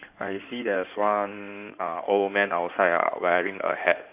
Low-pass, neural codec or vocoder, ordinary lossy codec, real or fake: 3.6 kHz; codec, 16 kHz in and 24 kHz out, 2.2 kbps, FireRedTTS-2 codec; none; fake